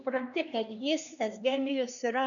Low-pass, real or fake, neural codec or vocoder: 7.2 kHz; fake; codec, 16 kHz, 2 kbps, X-Codec, HuBERT features, trained on LibriSpeech